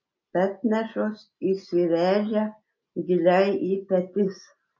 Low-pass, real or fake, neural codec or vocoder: 7.2 kHz; real; none